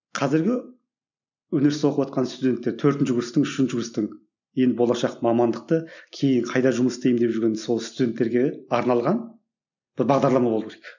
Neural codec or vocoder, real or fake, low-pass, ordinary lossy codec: none; real; 7.2 kHz; none